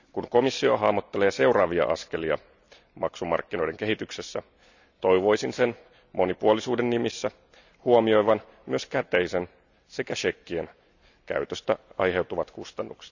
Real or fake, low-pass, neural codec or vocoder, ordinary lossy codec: real; 7.2 kHz; none; none